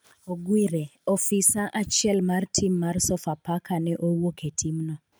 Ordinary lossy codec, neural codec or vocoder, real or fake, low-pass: none; none; real; none